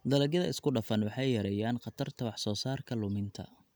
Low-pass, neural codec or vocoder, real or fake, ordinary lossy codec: none; none; real; none